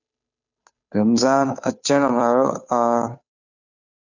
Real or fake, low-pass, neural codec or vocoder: fake; 7.2 kHz; codec, 16 kHz, 2 kbps, FunCodec, trained on Chinese and English, 25 frames a second